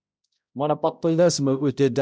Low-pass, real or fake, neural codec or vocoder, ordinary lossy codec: none; fake; codec, 16 kHz, 0.5 kbps, X-Codec, HuBERT features, trained on balanced general audio; none